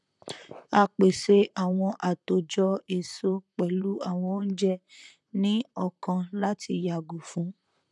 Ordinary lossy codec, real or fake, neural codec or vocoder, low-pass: none; fake; vocoder, 44.1 kHz, 128 mel bands, Pupu-Vocoder; 10.8 kHz